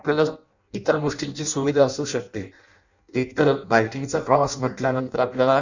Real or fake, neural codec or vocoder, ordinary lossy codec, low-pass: fake; codec, 16 kHz in and 24 kHz out, 0.6 kbps, FireRedTTS-2 codec; none; 7.2 kHz